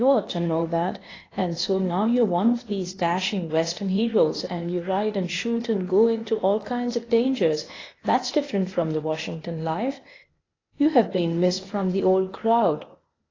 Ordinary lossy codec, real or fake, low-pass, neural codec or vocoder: AAC, 32 kbps; fake; 7.2 kHz; codec, 16 kHz, 0.8 kbps, ZipCodec